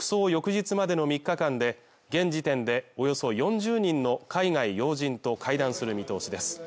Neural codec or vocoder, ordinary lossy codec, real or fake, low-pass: none; none; real; none